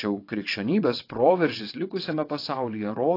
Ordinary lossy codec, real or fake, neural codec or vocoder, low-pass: AAC, 32 kbps; fake; vocoder, 22.05 kHz, 80 mel bands, WaveNeXt; 5.4 kHz